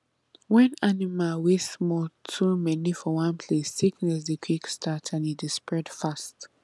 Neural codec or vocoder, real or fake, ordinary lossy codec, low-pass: none; real; none; none